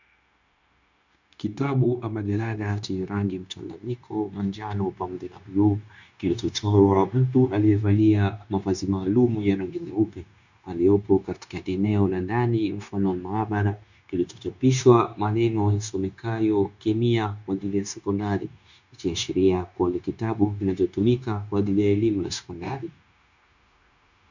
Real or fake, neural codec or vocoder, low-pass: fake; codec, 16 kHz, 0.9 kbps, LongCat-Audio-Codec; 7.2 kHz